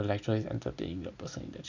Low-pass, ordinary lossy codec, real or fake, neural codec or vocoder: 7.2 kHz; none; real; none